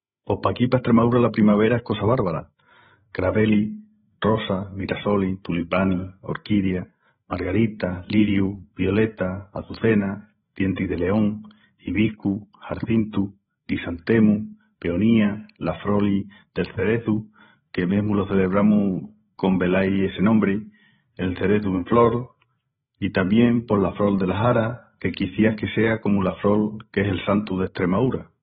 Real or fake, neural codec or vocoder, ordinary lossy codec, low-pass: fake; codec, 16 kHz, 16 kbps, FreqCodec, larger model; AAC, 16 kbps; 7.2 kHz